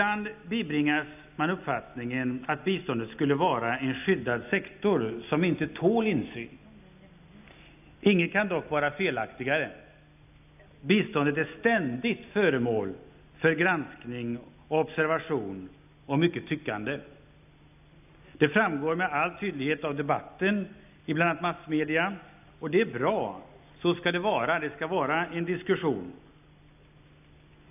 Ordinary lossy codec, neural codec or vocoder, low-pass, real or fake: none; none; 3.6 kHz; real